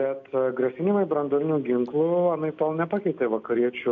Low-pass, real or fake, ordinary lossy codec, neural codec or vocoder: 7.2 kHz; real; AAC, 48 kbps; none